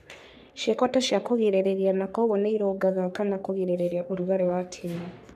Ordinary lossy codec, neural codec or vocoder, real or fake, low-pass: none; codec, 44.1 kHz, 3.4 kbps, Pupu-Codec; fake; 14.4 kHz